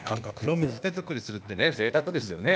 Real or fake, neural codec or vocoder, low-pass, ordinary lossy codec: fake; codec, 16 kHz, 0.8 kbps, ZipCodec; none; none